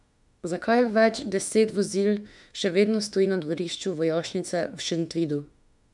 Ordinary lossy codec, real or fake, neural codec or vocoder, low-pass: none; fake; autoencoder, 48 kHz, 32 numbers a frame, DAC-VAE, trained on Japanese speech; 10.8 kHz